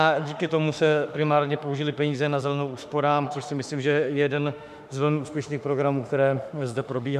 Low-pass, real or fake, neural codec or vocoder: 14.4 kHz; fake; autoencoder, 48 kHz, 32 numbers a frame, DAC-VAE, trained on Japanese speech